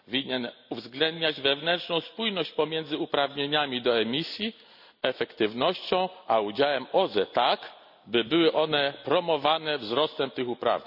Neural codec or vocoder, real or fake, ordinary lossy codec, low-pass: none; real; none; 5.4 kHz